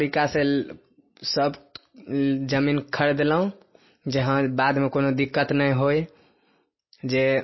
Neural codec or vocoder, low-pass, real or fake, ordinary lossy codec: none; 7.2 kHz; real; MP3, 24 kbps